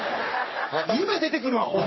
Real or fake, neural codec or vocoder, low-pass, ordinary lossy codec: fake; codec, 44.1 kHz, 3.4 kbps, Pupu-Codec; 7.2 kHz; MP3, 24 kbps